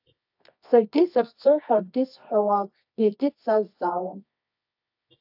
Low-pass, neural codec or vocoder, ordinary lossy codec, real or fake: 5.4 kHz; codec, 24 kHz, 0.9 kbps, WavTokenizer, medium music audio release; AAC, 48 kbps; fake